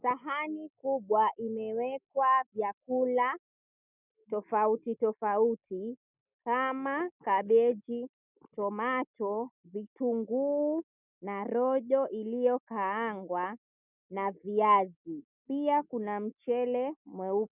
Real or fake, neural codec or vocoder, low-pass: real; none; 3.6 kHz